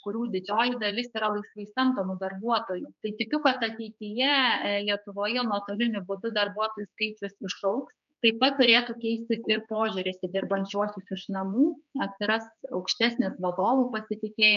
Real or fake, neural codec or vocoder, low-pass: fake; codec, 16 kHz, 4 kbps, X-Codec, HuBERT features, trained on general audio; 7.2 kHz